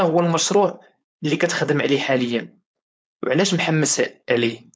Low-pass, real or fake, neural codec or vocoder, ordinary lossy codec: none; fake; codec, 16 kHz, 4.8 kbps, FACodec; none